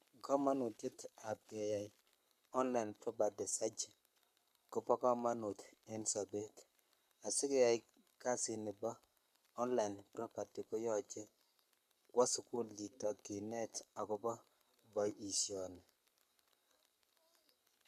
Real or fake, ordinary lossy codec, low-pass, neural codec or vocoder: fake; none; 14.4 kHz; codec, 44.1 kHz, 7.8 kbps, Pupu-Codec